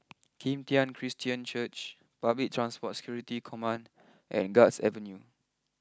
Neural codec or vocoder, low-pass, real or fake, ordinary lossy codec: none; none; real; none